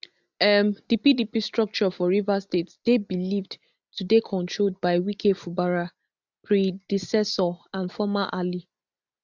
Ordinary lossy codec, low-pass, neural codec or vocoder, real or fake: none; 7.2 kHz; none; real